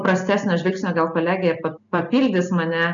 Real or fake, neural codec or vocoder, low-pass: real; none; 7.2 kHz